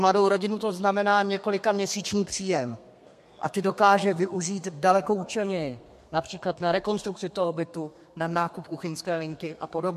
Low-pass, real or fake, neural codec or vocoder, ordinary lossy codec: 14.4 kHz; fake; codec, 32 kHz, 1.9 kbps, SNAC; MP3, 64 kbps